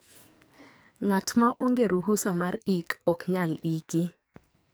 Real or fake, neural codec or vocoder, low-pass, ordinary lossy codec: fake; codec, 44.1 kHz, 2.6 kbps, SNAC; none; none